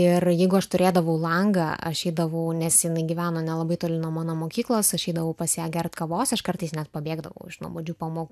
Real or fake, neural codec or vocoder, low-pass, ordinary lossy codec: real; none; 14.4 kHz; AAC, 96 kbps